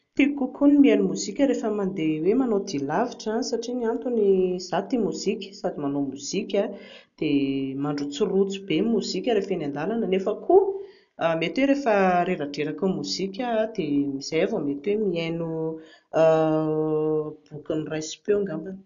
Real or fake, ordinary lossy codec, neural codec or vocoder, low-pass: real; none; none; 7.2 kHz